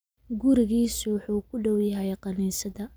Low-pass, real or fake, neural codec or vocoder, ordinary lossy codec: none; real; none; none